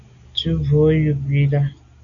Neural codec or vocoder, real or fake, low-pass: none; real; 7.2 kHz